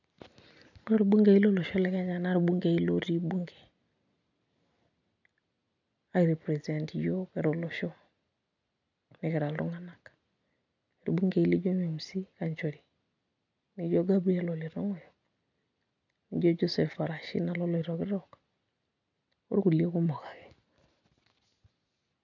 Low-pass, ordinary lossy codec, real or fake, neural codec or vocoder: 7.2 kHz; none; real; none